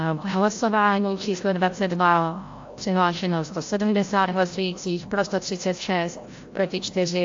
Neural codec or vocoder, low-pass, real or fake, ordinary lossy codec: codec, 16 kHz, 0.5 kbps, FreqCodec, larger model; 7.2 kHz; fake; AAC, 48 kbps